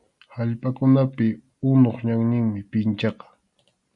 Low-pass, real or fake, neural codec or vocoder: 10.8 kHz; real; none